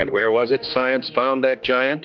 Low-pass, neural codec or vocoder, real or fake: 7.2 kHz; codec, 16 kHz, 2 kbps, X-Codec, HuBERT features, trained on general audio; fake